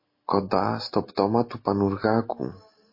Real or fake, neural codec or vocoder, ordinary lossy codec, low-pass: real; none; MP3, 24 kbps; 5.4 kHz